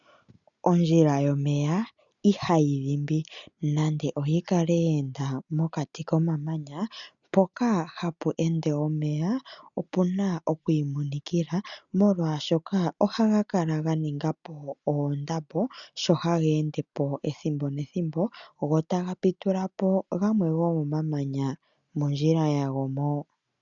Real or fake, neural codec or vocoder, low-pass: real; none; 7.2 kHz